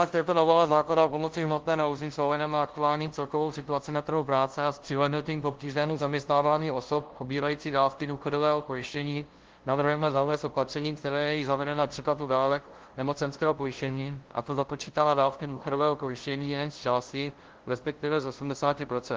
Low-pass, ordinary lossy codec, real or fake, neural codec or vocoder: 7.2 kHz; Opus, 16 kbps; fake; codec, 16 kHz, 0.5 kbps, FunCodec, trained on LibriTTS, 25 frames a second